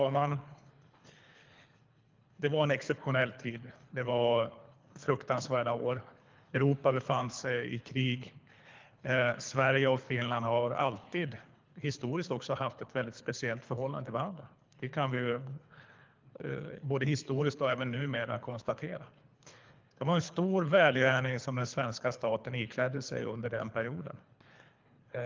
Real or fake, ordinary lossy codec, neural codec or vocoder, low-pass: fake; Opus, 24 kbps; codec, 24 kHz, 3 kbps, HILCodec; 7.2 kHz